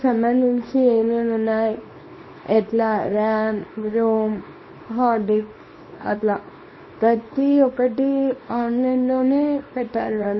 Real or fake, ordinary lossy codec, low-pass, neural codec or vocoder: fake; MP3, 24 kbps; 7.2 kHz; codec, 24 kHz, 0.9 kbps, WavTokenizer, small release